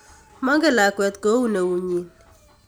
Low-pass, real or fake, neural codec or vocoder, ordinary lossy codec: none; real; none; none